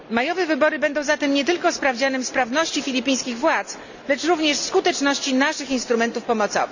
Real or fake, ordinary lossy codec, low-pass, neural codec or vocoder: real; none; 7.2 kHz; none